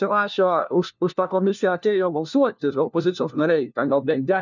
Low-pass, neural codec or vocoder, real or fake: 7.2 kHz; codec, 16 kHz, 1 kbps, FunCodec, trained on LibriTTS, 50 frames a second; fake